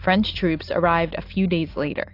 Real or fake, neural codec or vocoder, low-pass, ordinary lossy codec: real; none; 5.4 kHz; AAC, 32 kbps